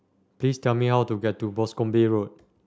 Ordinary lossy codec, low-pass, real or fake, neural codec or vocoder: none; none; real; none